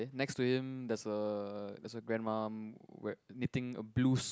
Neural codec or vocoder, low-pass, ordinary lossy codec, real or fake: none; none; none; real